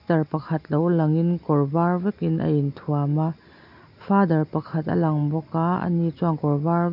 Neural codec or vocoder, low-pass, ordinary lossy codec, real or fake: none; 5.4 kHz; none; real